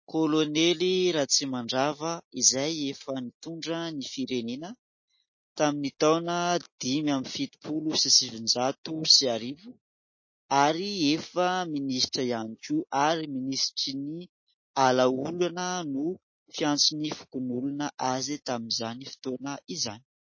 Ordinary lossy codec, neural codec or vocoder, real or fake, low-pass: MP3, 32 kbps; none; real; 7.2 kHz